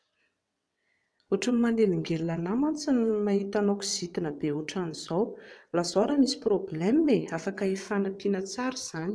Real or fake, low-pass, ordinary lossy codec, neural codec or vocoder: fake; none; none; vocoder, 22.05 kHz, 80 mel bands, WaveNeXt